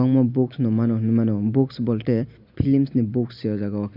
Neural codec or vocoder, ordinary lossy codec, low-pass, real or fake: none; none; 5.4 kHz; real